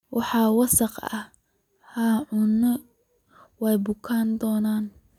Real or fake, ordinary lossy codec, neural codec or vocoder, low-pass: real; none; none; 19.8 kHz